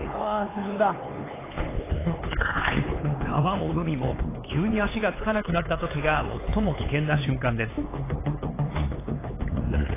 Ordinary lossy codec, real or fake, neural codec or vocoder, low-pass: AAC, 16 kbps; fake; codec, 16 kHz, 4 kbps, X-Codec, HuBERT features, trained on LibriSpeech; 3.6 kHz